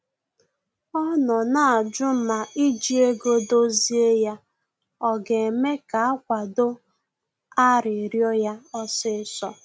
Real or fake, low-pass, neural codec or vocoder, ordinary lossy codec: real; none; none; none